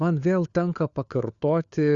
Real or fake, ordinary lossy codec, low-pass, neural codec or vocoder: fake; Opus, 64 kbps; 7.2 kHz; codec, 16 kHz, 4 kbps, FunCodec, trained on LibriTTS, 50 frames a second